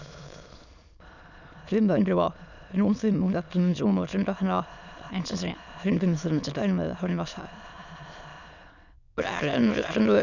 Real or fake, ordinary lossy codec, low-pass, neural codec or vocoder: fake; none; 7.2 kHz; autoencoder, 22.05 kHz, a latent of 192 numbers a frame, VITS, trained on many speakers